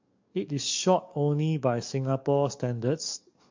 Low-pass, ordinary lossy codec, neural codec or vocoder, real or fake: 7.2 kHz; MP3, 48 kbps; codec, 44.1 kHz, 7.8 kbps, DAC; fake